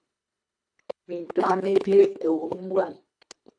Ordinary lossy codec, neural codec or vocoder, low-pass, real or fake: AAC, 64 kbps; codec, 24 kHz, 1.5 kbps, HILCodec; 9.9 kHz; fake